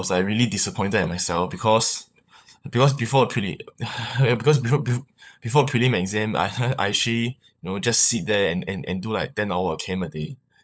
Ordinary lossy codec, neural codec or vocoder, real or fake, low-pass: none; codec, 16 kHz, 16 kbps, FunCodec, trained on LibriTTS, 50 frames a second; fake; none